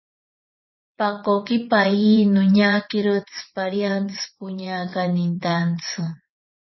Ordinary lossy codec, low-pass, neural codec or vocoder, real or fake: MP3, 24 kbps; 7.2 kHz; vocoder, 22.05 kHz, 80 mel bands, WaveNeXt; fake